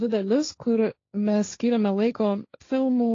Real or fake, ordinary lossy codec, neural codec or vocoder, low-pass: fake; AAC, 32 kbps; codec, 16 kHz, 1.1 kbps, Voila-Tokenizer; 7.2 kHz